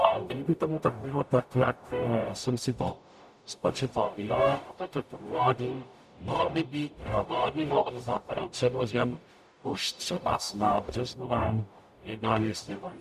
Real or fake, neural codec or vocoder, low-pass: fake; codec, 44.1 kHz, 0.9 kbps, DAC; 14.4 kHz